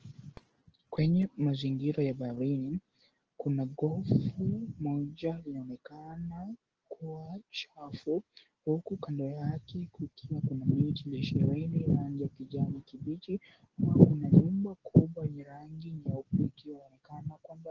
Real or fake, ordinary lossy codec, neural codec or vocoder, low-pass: real; Opus, 16 kbps; none; 7.2 kHz